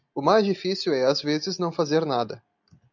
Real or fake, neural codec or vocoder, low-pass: real; none; 7.2 kHz